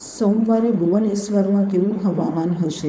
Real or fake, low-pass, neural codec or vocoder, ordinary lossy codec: fake; none; codec, 16 kHz, 4.8 kbps, FACodec; none